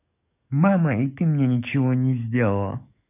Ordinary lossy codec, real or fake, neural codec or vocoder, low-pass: none; fake; codec, 44.1 kHz, 7.8 kbps, DAC; 3.6 kHz